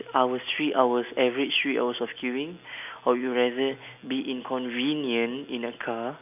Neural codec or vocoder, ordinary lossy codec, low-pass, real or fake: none; none; 3.6 kHz; real